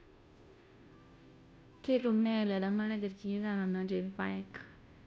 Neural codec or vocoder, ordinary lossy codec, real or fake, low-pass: codec, 16 kHz, 0.5 kbps, FunCodec, trained on Chinese and English, 25 frames a second; none; fake; none